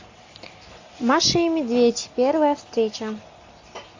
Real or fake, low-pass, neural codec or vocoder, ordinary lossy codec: real; 7.2 kHz; none; AAC, 48 kbps